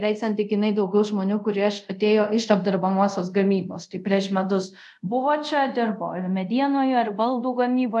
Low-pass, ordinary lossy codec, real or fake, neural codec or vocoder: 10.8 kHz; MP3, 96 kbps; fake; codec, 24 kHz, 0.5 kbps, DualCodec